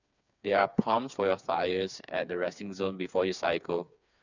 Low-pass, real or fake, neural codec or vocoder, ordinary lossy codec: 7.2 kHz; fake; codec, 16 kHz, 4 kbps, FreqCodec, smaller model; none